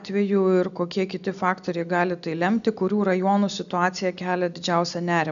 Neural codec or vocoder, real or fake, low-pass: none; real; 7.2 kHz